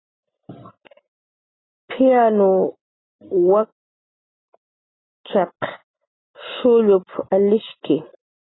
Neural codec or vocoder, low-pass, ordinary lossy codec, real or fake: none; 7.2 kHz; AAC, 16 kbps; real